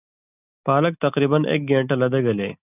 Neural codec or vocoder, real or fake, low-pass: none; real; 3.6 kHz